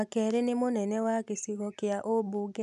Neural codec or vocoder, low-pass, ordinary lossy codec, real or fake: none; 10.8 kHz; none; real